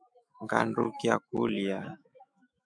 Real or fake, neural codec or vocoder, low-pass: fake; autoencoder, 48 kHz, 128 numbers a frame, DAC-VAE, trained on Japanese speech; 9.9 kHz